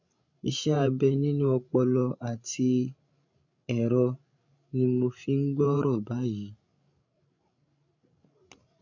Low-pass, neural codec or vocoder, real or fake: 7.2 kHz; codec, 16 kHz, 16 kbps, FreqCodec, larger model; fake